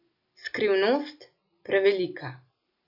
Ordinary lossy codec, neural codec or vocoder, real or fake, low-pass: none; none; real; 5.4 kHz